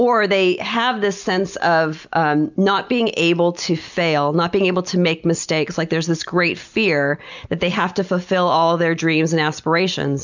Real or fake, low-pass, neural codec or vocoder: real; 7.2 kHz; none